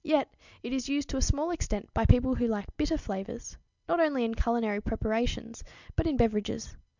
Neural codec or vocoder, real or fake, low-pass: none; real; 7.2 kHz